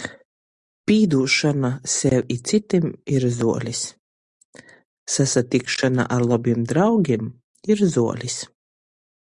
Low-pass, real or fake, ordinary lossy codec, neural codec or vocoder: 10.8 kHz; real; Opus, 64 kbps; none